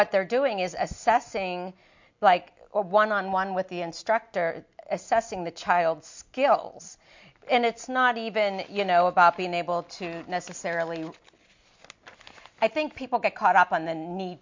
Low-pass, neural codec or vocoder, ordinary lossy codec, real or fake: 7.2 kHz; none; MP3, 48 kbps; real